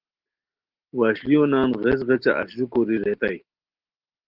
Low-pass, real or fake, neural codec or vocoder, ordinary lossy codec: 5.4 kHz; real; none; Opus, 16 kbps